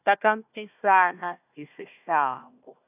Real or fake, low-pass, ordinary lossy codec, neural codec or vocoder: fake; 3.6 kHz; none; codec, 16 kHz, 1 kbps, FunCodec, trained on Chinese and English, 50 frames a second